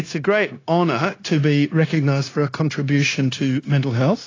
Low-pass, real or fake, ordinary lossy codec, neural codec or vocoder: 7.2 kHz; fake; AAC, 32 kbps; codec, 16 kHz, 0.9 kbps, LongCat-Audio-Codec